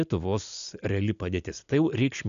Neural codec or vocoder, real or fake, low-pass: none; real; 7.2 kHz